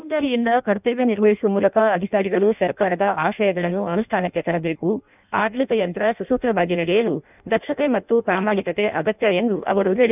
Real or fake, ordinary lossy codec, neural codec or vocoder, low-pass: fake; none; codec, 16 kHz in and 24 kHz out, 0.6 kbps, FireRedTTS-2 codec; 3.6 kHz